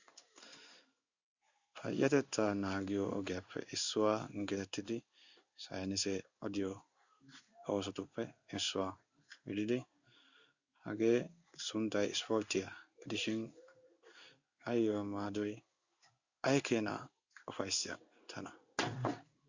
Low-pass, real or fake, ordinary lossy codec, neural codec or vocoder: 7.2 kHz; fake; Opus, 64 kbps; codec, 16 kHz in and 24 kHz out, 1 kbps, XY-Tokenizer